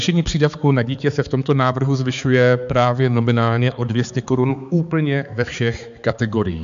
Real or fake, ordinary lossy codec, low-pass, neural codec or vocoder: fake; MP3, 64 kbps; 7.2 kHz; codec, 16 kHz, 4 kbps, X-Codec, HuBERT features, trained on balanced general audio